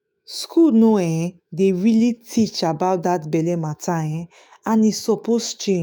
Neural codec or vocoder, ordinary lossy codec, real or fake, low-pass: autoencoder, 48 kHz, 128 numbers a frame, DAC-VAE, trained on Japanese speech; none; fake; none